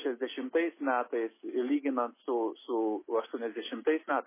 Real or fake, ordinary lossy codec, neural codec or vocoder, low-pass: real; MP3, 16 kbps; none; 3.6 kHz